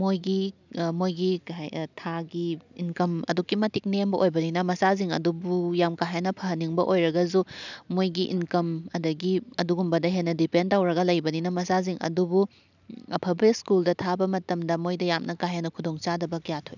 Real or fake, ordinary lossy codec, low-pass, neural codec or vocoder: real; none; 7.2 kHz; none